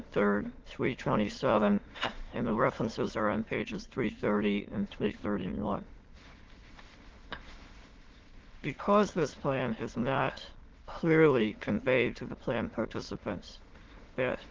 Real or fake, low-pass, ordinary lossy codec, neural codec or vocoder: fake; 7.2 kHz; Opus, 16 kbps; autoencoder, 22.05 kHz, a latent of 192 numbers a frame, VITS, trained on many speakers